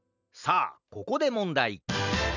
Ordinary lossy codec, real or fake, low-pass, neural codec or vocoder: none; real; 7.2 kHz; none